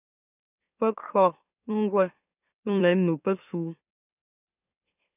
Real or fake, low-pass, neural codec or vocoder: fake; 3.6 kHz; autoencoder, 44.1 kHz, a latent of 192 numbers a frame, MeloTTS